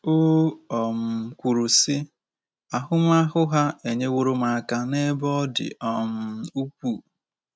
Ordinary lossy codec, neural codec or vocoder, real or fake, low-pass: none; none; real; none